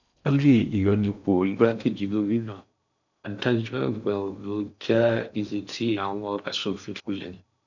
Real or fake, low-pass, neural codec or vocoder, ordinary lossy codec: fake; 7.2 kHz; codec, 16 kHz in and 24 kHz out, 0.6 kbps, FocalCodec, streaming, 4096 codes; none